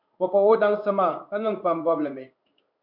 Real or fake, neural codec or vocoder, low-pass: fake; codec, 16 kHz in and 24 kHz out, 1 kbps, XY-Tokenizer; 5.4 kHz